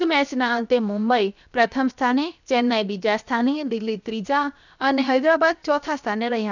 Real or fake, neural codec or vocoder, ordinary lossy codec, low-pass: fake; codec, 16 kHz, 0.7 kbps, FocalCodec; none; 7.2 kHz